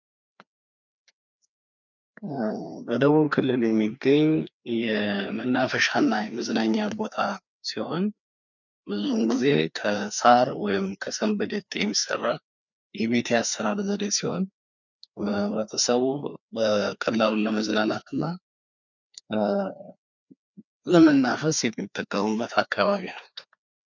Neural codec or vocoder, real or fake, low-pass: codec, 16 kHz, 2 kbps, FreqCodec, larger model; fake; 7.2 kHz